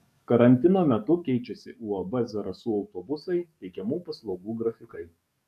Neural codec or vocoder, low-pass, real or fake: codec, 44.1 kHz, 7.8 kbps, DAC; 14.4 kHz; fake